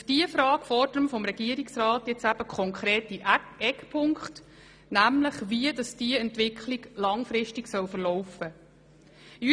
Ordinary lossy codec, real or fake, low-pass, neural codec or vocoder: none; real; 9.9 kHz; none